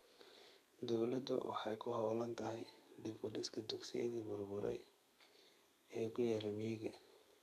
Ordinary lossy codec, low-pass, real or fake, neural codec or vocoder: none; 14.4 kHz; fake; codec, 32 kHz, 1.9 kbps, SNAC